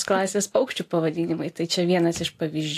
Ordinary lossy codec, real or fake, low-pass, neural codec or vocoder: AAC, 64 kbps; real; 14.4 kHz; none